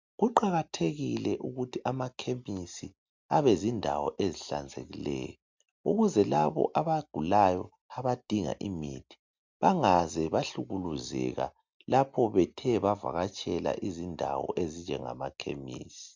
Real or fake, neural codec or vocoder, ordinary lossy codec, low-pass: real; none; MP3, 64 kbps; 7.2 kHz